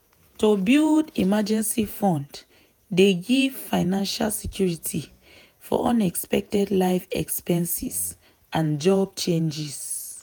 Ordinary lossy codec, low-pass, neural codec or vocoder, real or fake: none; none; vocoder, 48 kHz, 128 mel bands, Vocos; fake